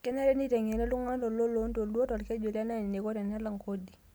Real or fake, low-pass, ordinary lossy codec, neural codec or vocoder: real; none; none; none